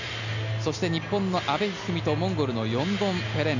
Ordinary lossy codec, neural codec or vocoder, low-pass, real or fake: none; none; 7.2 kHz; real